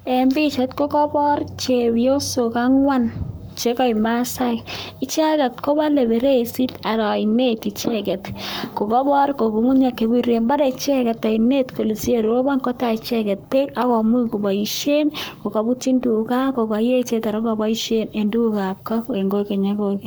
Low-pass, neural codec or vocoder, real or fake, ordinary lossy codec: none; codec, 44.1 kHz, 7.8 kbps, Pupu-Codec; fake; none